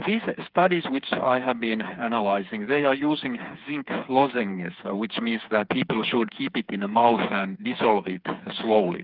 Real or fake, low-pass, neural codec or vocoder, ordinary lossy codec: fake; 5.4 kHz; codec, 16 kHz, 4 kbps, FreqCodec, smaller model; Opus, 32 kbps